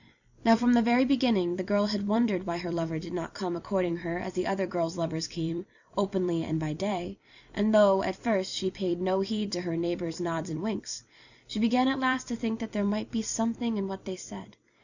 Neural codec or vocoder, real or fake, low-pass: vocoder, 44.1 kHz, 128 mel bands every 256 samples, BigVGAN v2; fake; 7.2 kHz